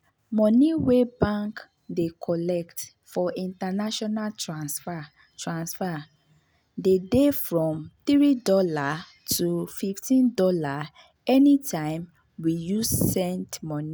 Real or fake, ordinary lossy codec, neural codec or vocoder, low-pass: real; none; none; none